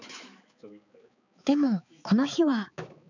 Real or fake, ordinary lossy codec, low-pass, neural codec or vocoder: fake; none; 7.2 kHz; codec, 16 kHz, 4 kbps, X-Codec, HuBERT features, trained on balanced general audio